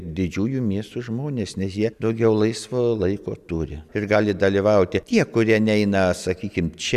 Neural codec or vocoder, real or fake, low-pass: none; real; 14.4 kHz